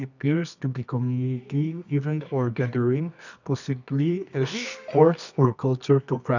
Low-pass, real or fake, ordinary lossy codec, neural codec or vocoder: 7.2 kHz; fake; none; codec, 24 kHz, 0.9 kbps, WavTokenizer, medium music audio release